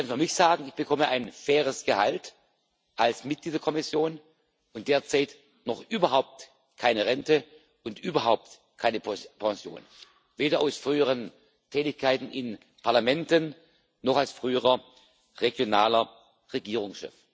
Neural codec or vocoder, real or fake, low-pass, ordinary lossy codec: none; real; none; none